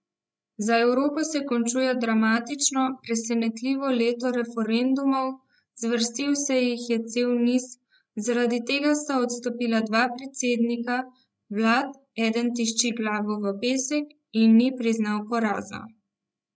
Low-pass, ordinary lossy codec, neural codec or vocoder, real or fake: none; none; codec, 16 kHz, 16 kbps, FreqCodec, larger model; fake